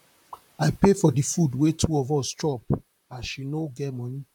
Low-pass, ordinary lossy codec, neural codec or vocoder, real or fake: 19.8 kHz; none; vocoder, 44.1 kHz, 128 mel bands, Pupu-Vocoder; fake